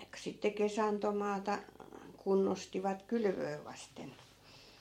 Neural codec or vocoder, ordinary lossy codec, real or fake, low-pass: vocoder, 44.1 kHz, 128 mel bands every 256 samples, BigVGAN v2; MP3, 64 kbps; fake; 19.8 kHz